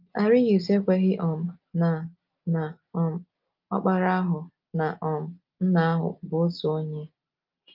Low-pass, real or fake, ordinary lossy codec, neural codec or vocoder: 5.4 kHz; real; Opus, 32 kbps; none